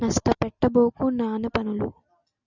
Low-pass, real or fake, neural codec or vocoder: 7.2 kHz; real; none